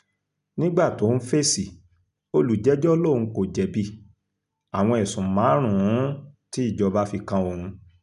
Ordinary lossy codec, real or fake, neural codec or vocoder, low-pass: none; real; none; 10.8 kHz